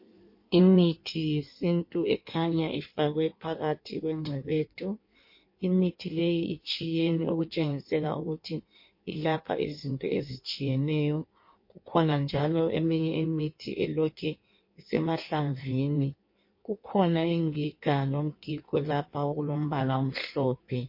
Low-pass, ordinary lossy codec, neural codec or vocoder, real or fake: 5.4 kHz; MP3, 32 kbps; codec, 16 kHz in and 24 kHz out, 1.1 kbps, FireRedTTS-2 codec; fake